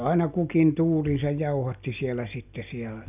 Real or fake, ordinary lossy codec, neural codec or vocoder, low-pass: real; none; none; 3.6 kHz